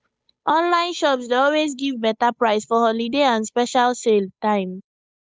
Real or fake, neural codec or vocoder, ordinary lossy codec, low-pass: fake; codec, 16 kHz, 8 kbps, FunCodec, trained on Chinese and English, 25 frames a second; none; none